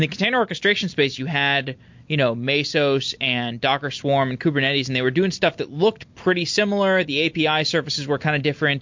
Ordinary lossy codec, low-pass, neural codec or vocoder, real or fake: MP3, 48 kbps; 7.2 kHz; none; real